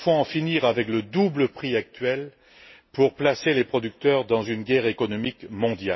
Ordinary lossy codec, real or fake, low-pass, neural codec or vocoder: MP3, 24 kbps; real; 7.2 kHz; none